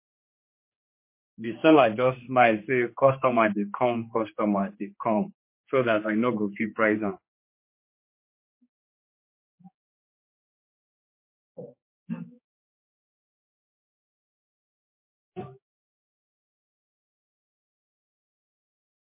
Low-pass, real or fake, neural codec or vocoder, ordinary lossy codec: 3.6 kHz; fake; codec, 16 kHz, 2 kbps, X-Codec, HuBERT features, trained on general audio; MP3, 24 kbps